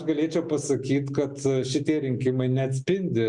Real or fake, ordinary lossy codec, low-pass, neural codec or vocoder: real; Opus, 64 kbps; 10.8 kHz; none